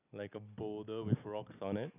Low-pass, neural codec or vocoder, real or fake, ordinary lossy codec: 3.6 kHz; none; real; none